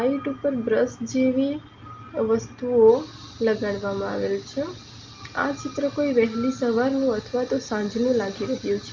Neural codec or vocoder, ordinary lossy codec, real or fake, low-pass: none; Opus, 24 kbps; real; 7.2 kHz